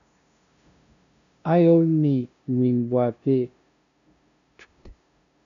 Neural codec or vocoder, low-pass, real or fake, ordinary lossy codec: codec, 16 kHz, 0.5 kbps, FunCodec, trained on LibriTTS, 25 frames a second; 7.2 kHz; fake; MP3, 96 kbps